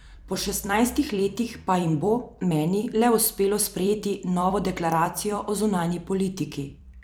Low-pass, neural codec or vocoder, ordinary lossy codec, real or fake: none; vocoder, 44.1 kHz, 128 mel bands every 256 samples, BigVGAN v2; none; fake